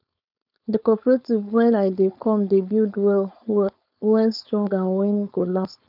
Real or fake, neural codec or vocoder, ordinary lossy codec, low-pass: fake; codec, 16 kHz, 4.8 kbps, FACodec; none; 5.4 kHz